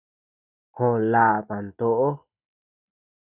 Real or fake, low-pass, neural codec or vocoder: real; 3.6 kHz; none